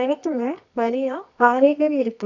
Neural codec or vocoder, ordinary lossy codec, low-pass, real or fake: codec, 24 kHz, 0.9 kbps, WavTokenizer, medium music audio release; none; 7.2 kHz; fake